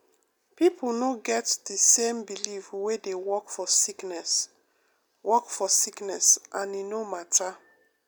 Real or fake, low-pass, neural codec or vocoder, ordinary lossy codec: real; none; none; none